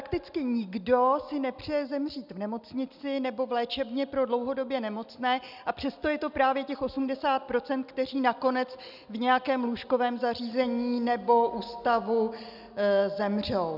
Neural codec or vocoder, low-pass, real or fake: none; 5.4 kHz; real